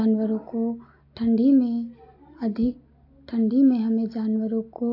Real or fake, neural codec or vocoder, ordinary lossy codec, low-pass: real; none; AAC, 32 kbps; 5.4 kHz